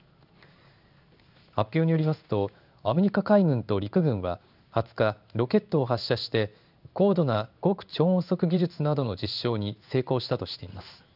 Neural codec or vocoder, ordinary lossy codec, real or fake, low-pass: codec, 16 kHz in and 24 kHz out, 1 kbps, XY-Tokenizer; none; fake; 5.4 kHz